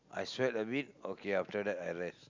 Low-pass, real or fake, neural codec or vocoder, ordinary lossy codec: 7.2 kHz; real; none; MP3, 64 kbps